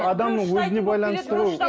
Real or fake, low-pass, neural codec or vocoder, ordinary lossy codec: real; none; none; none